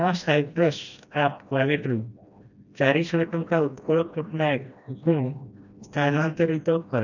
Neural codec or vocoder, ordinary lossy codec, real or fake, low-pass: codec, 16 kHz, 1 kbps, FreqCodec, smaller model; none; fake; 7.2 kHz